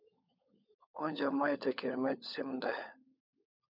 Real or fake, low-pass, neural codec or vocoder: fake; 5.4 kHz; codec, 16 kHz, 16 kbps, FunCodec, trained on LibriTTS, 50 frames a second